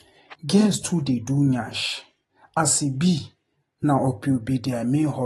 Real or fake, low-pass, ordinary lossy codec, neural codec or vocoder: real; 19.8 kHz; AAC, 32 kbps; none